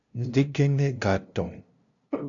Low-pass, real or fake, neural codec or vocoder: 7.2 kHz; fake; codec, 16 kHz, 0.5 kbps, FunCodec, trained on LibriTTS, 25 frames a second